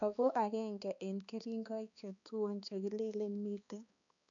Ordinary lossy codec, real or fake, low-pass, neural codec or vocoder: none; fake; 7.2 kHz; codec, 16 kHz, 2 kbps, X-Codec, HuBERT features, trained on balanced general audio